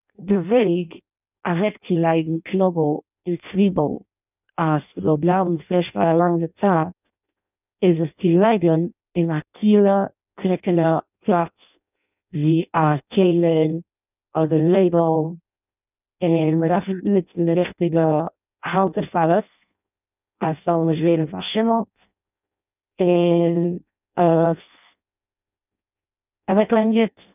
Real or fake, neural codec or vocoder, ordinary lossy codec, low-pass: fake; codec, 16 kHz in and 24 kHz out, 0.6 kbps, FireRedTTS-2 codec; none; 3.6 kHz